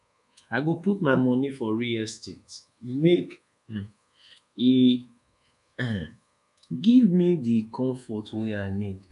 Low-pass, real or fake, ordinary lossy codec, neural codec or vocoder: 10.8 kHz; fake; none; codec, 24 kHz, 1.2 kbps, DualCodec